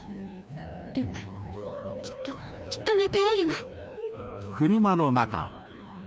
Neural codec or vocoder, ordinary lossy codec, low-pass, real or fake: codec, 16 kHz, 1 kbps, FreqCodec, larger model; none; none; fake